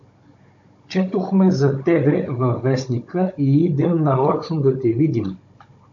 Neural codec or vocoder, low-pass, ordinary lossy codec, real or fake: codec, 16 kHz, 16 kbps, FunCodec, trained on Chinese and English, 50 frames a second; 7.2 kHz; AAC, 64 kbps; fake